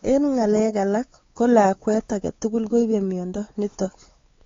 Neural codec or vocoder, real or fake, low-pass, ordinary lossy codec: codec, 16 kHz, 4 kbps, X-Codec, WavLM features, trained on Multilingual LibriSpeech; fake; 7.2 kHz; AAC, 32 kbps